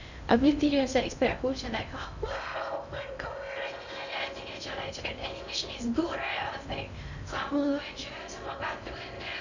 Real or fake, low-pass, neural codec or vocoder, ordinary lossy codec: fake; 7.2 kHz; codec, 16 kHz in and 24 kHz out, 0.6 kbps, FocalCodec, streaming, 2048 codes; none